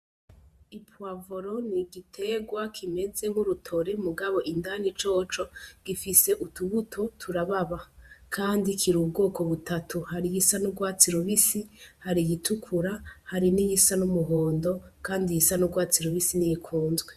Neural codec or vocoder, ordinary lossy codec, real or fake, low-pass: vocoder, 48 kHz, 128 mel bands, Vocos; Opus, 64 kbps; fake; 14.4 kHz